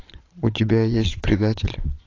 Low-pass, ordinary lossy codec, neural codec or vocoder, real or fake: 7.2 kHz; AAC, 32 kbps; vocoder, 22.05 kHz, 80 mel bands, Vocos; fake